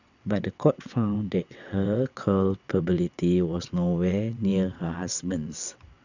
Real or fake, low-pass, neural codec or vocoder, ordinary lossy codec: fake; 7.2 kHz; vocoder, 22.05 kHz, 80 mel bands, WaveNeXt; none